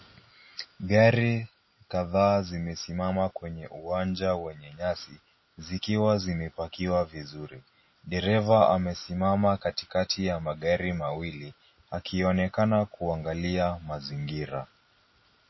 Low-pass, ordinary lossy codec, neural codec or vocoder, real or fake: 7.2 kHz; MP3, 24 kbps; none; real